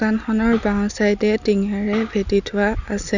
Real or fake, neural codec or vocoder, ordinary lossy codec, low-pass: real; none; none; 7.2 kHz